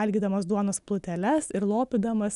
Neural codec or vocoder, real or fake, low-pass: none; real; 10.8 kHz